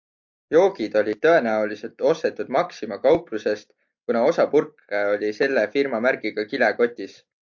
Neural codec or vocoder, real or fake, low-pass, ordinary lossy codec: none; real; 7.2 kHz; MP3, 48 kbps